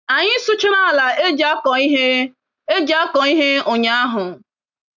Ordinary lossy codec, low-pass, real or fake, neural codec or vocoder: none; 7.2 kHz; real; none